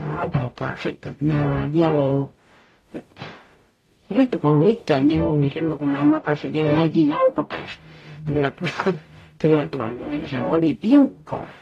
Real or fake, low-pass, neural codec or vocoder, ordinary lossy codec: fake; 14.4 kHz; codec, 44.1 kHz, 0.9 kbps, DAC; AAC, 48 kbps